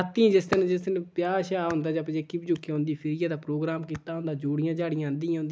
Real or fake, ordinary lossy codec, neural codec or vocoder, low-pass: real; none; none; none